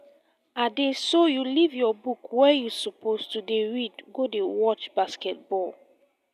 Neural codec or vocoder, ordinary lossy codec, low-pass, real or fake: none; none; 14.4 kHz; real